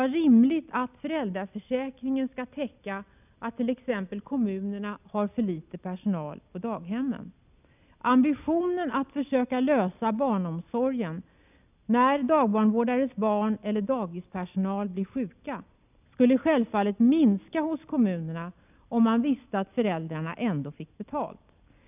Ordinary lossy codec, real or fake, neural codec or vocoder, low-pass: none; real; none; 3.6 kHz